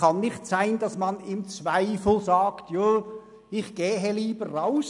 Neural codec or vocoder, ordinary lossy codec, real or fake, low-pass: none; none; real; 10.8 kHz